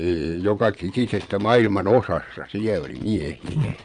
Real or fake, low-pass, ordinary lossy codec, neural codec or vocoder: fake; 9.9 kHz; none; vocoder, 22.05 kHz, 80 mel bands, Vocos